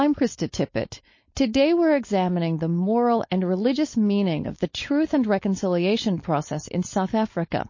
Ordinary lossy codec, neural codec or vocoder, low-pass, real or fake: MP3, 32 kbps; codec, 16 kHz, 4.8 kbps, FACodec; 7.2 kHz; fake